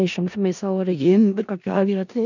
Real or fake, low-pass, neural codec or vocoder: fake; 7.2 kHz; codec, 16 kHz in and 24 kHz out, 0.4 kbps, LongCat-Audio-Codec, four codebook decoder